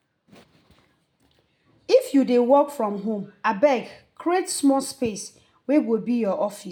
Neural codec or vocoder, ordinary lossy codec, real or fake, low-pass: none; none; real; none